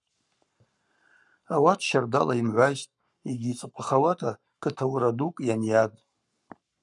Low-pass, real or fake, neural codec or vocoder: 10.8 kHz; fake; codec, 44.1 kHz, 7.8 kbps, Pupu-Codec